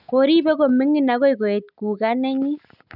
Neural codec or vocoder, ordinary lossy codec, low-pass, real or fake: none; none; 5.4 kHz; real